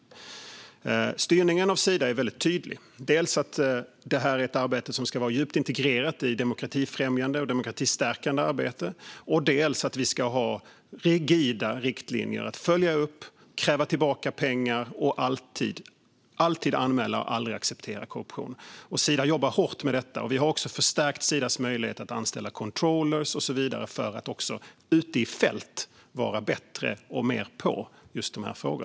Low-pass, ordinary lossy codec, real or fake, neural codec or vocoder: none; none; real; none